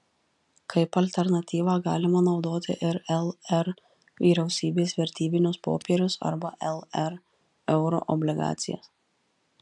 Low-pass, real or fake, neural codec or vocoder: 10.8 kHz; real; none